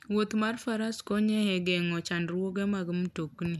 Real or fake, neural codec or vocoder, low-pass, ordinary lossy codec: real; none; 14.4 kHz; none